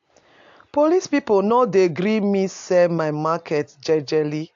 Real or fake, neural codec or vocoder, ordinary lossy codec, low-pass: real; none; AAC, 64 kbps; 7.2 kHz